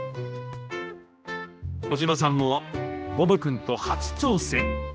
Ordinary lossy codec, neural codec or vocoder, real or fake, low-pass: none; codec, 16 kHz, 1 kbps, X-Codec, HuBERT features, trained on general audio; fake; none